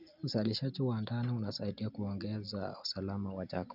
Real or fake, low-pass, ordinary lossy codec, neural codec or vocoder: real; 5.4 kHz; none; none